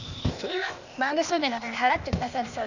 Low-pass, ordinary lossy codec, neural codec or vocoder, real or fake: 7.2 kHz; none; codec, 16 kHz, 0.8 kbps, ZipCodec; fake